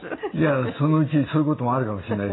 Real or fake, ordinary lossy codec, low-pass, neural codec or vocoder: real; AAC, 16 kbps; 7.2 kHz; none